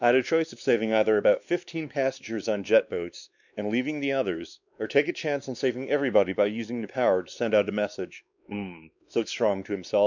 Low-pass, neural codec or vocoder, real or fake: 7.2 kHz; codec, 16 kHz, 2 kbps, X-Codec, WavLM features, trained on Multilingual LibriSpeech; fake